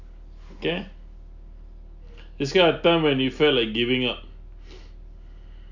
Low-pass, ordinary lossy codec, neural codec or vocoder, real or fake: 7.2 kHz; none; none; real